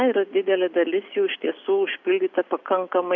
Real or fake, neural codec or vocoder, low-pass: real; none; 7.2 kHz